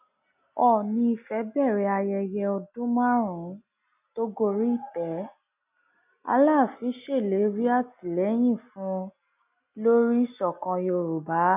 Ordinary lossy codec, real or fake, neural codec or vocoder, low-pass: none; real; none; 3.6 kHz